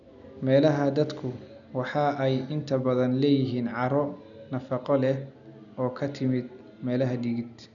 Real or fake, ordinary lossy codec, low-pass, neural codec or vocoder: real; none; 7.2 kHz; none